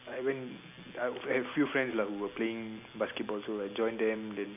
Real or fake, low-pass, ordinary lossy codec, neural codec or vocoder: real; 3.6 kHz; none; none